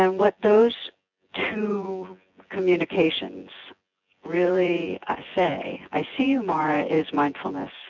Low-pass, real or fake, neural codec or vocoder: 7.2 kHz; fake; vocoder, 24 kHz, 100 mel bands, Vocos